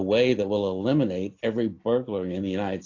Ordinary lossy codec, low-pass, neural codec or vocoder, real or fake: AAC, 48 kbps; 7.2 kHz; none; real